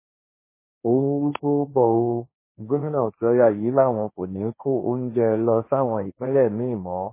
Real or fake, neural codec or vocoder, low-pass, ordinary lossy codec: fake; codec, 16 kHz, 1.1 kbps, Voila-Tokenizer; 3.6 kHz; MP3, 16 kbps